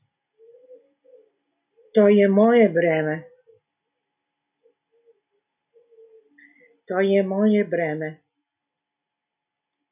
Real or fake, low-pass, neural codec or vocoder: real; 3.6 kHz; none